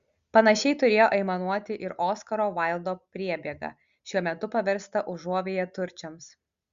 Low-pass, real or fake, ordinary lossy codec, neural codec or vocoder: 7.2 kHz; real; Opus, 64 kbps; none